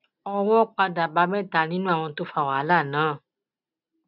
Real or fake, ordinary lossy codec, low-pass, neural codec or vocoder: real; none; 5.4 kHz; none